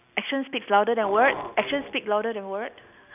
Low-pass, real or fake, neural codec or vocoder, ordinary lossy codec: 3.6 kHz; real; none; none